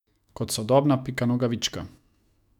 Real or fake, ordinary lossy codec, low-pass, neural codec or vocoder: fake; none; 19.8 kHz; autoencoder, 48 kHz, 128 numbers a frame, DAC-VAE, trained on Japanese speech